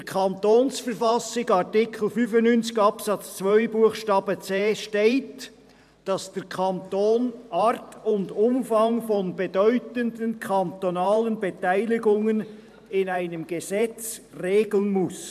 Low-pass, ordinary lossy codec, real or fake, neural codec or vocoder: 14.4 kHz; MP3, 96 kbps; fake; vocoder, 44.1 kHz, 128 mel bands every 512 samples, BigVGAN v2